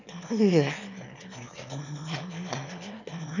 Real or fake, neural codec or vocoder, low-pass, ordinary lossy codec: fake; autoencoder, 22.05 kHz, a latent of 192 numbers a frame, VITS, trained on one speaker; 7.2 kHz; none